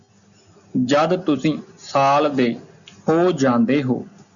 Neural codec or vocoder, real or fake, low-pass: none; real; 7.2 kHz